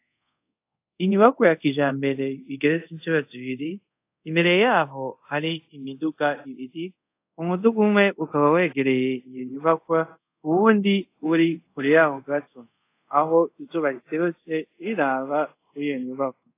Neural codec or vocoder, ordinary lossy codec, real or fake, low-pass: codec, 24 kHz, 0.5 kbps, DualCodec; AAC, 24 kbps; fake; 3.6 kHz